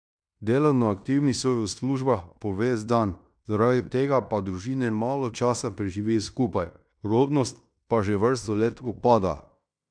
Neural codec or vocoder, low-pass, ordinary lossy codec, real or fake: codec, 16 kHz in and 24 kHz out, 0.9 kbps, LongCat-Audio-Codec, fine tuned four codebook decoder; 9.9 kHz; none; fake